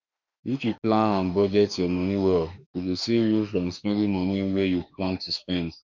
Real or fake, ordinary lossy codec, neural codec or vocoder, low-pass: fake; none; autoencoder, 48 kHz, 32 numbers a frame, DAC-VAE, trained on Japanese speech; 7.2 kHz